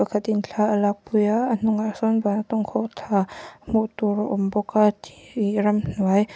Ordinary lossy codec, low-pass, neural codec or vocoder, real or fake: none; none; none; real